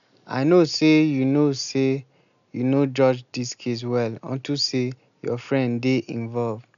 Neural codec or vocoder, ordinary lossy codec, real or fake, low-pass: none; none; real; 7.2 kHz